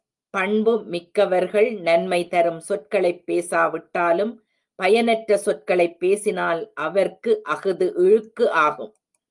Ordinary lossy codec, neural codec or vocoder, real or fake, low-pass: Opus, 32 kbps; none; real; 10.8 kHz